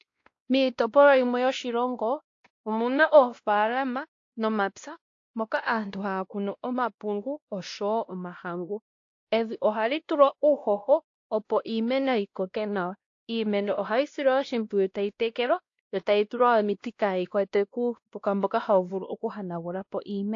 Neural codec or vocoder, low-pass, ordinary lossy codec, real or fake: codec, 16 kHz, 1 kbps, X-Codec, WavLM features, trained on Multilingual LibriSpeech; 7.2 kHz; AAC, 48 kbps; fake